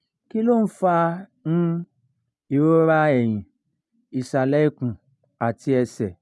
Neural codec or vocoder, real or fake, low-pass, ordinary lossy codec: none; real; none; none